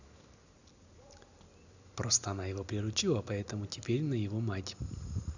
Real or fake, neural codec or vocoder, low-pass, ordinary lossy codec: real; none; 7.2 kHz; none